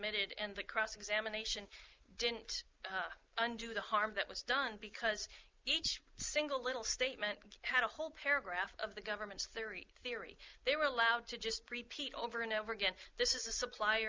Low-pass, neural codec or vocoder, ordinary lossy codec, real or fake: 7.2 kHz; none; Opus, 32 kbps; real